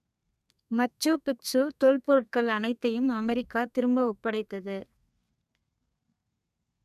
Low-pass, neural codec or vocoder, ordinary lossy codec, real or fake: 14.4 kHz; codec, 32 kHz, 1.9 kbps, SNAC; none; fake